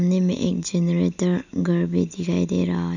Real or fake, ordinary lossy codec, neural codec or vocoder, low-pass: real; none; none; 7.2 kHz